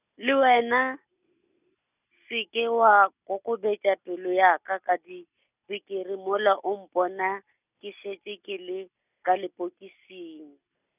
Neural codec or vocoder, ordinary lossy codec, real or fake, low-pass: none; none; real; 3.6 kHz